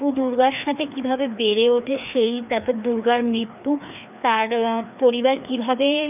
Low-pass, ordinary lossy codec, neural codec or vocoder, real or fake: 3.6 kHz; none; codec, 16 kHz, 2 kbps, FreqCodec, larger model; fake